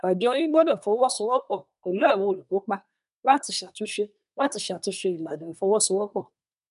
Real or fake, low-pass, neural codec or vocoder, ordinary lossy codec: fake; 10.8 kHz; codec, 24 kHz, 1 kbps, SNAC; none